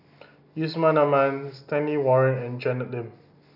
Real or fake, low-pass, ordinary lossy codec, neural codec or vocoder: real; 5.4 kHz; none; none